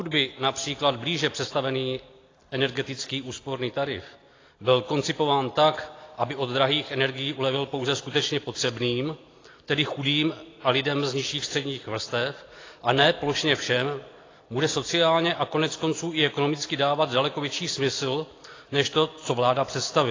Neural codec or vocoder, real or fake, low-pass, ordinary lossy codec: none; real; 7.2 kHz; AAC, 32 kbps